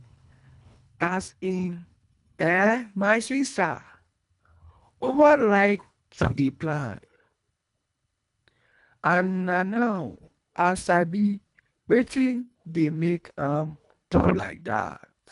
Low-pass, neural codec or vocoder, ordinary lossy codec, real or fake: 10.8 kHz; codec, 24 kHz, 1.5 kbps, HILCodec; none; fake